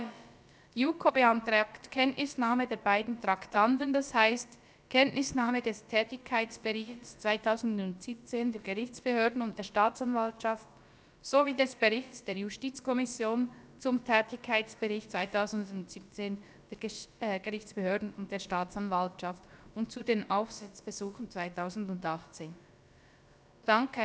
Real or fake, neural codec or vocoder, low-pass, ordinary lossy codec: fake; codec, 16 kHz, about 1 kbps, DyCAST, with the encoder's durations; none; none